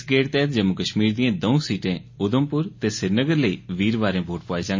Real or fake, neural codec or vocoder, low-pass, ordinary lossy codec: real; none; 7.2 kHz; MP3, 32 kbps